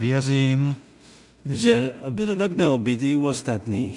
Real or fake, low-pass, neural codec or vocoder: fake; 10.8 kHz; codec, 16 kHz in and 24 kHz out, 0.4 kbps, LongCat-Audio-Codec, two codebook decoder